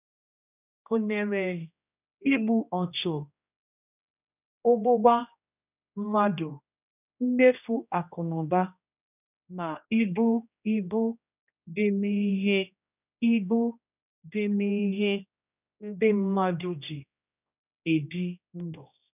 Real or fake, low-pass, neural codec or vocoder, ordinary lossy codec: fake; 3.6 kHz; codec, 16 kHz, 1 kbps, X-Codec, HuBERT features, trained on general audio; none